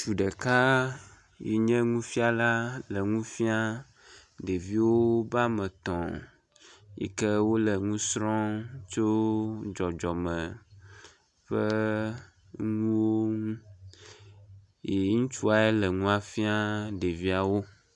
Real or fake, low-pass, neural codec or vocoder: real; 10.8 kHz; none